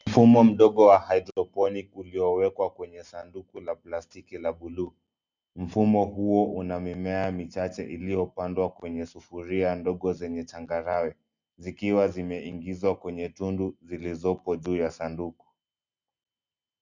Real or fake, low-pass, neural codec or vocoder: real; 7.2 kHz; none